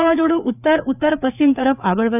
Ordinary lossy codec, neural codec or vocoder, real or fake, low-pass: none; codec, 16 kHz, 4 kbps, FreqCodec, larger model; fake; 3.6 kHz